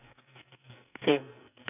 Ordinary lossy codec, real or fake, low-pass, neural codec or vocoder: none; fake; 3.6 kHz; codec, 44.1 kHz, 2.6 kbps, SNAC